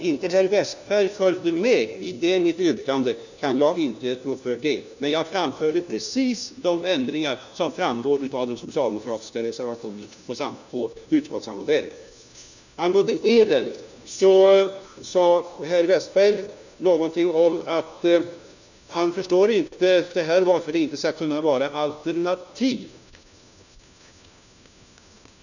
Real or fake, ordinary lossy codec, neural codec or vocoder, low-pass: fake; none; codec, 16 kHz, 1 kbps, FunCodec, trained on LibriTTS, 50 frames a second; 7.2 kHz